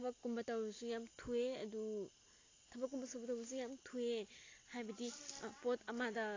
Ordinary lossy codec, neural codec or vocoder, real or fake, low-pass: AAC, 32 kbps; none; real; 7.2 kHz